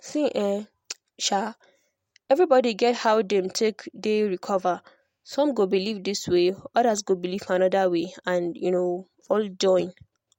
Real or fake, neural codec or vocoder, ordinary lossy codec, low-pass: real; none; MP3, 64 kbps; 19.8 kHz